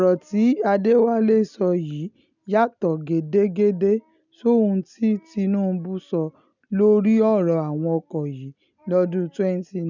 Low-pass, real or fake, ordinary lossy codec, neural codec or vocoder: 7.2 kHz; real; none; none